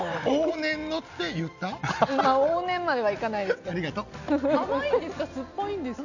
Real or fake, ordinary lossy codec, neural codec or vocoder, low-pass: real; none; none; 7.2 kHz